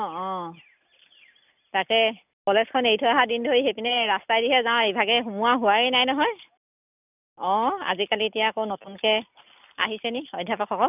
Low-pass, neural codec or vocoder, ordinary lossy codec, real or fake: 3.6 kHz; none; none; real